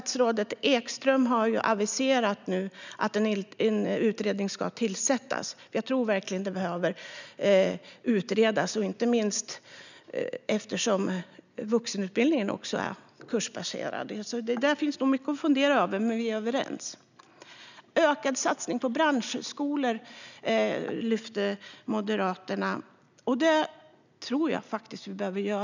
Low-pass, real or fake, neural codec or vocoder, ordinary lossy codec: 7.2 kHz; real; none; none